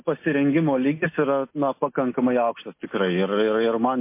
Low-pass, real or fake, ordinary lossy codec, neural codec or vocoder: 3.6 kHz; real; MP3, 24 kbps; none